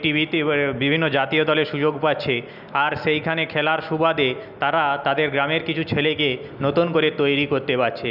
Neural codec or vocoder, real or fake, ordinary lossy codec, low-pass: none; real; none; 5.4 kHz